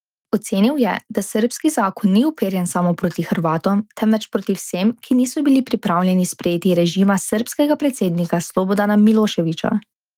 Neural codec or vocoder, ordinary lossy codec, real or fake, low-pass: autoencoder, 48 kHz, 128 numbers a frame, DAC-VAE, trained on Japanese speech; Opus, 24 kbps; fake; 19.8 kHz